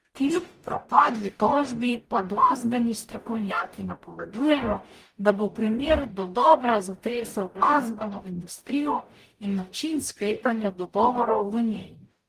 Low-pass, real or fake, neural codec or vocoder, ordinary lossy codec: 14.4 kHz; fake; codec, 44.1 kHz, 0.9 kbps, DAC; Opus, 32 kbps